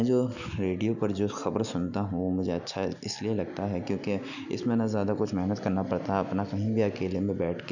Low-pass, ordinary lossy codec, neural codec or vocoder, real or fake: 7.2 kHz; none; none; real